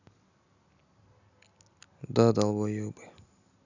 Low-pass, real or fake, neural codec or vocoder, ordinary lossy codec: 7.2 kHz; real; none; none